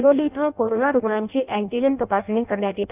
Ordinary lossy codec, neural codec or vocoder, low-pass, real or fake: none; codec, 16 kHz in and 24 kHz out, 0.6 kbps, FireRedTTS-2 codec; 3.6 kHz; fake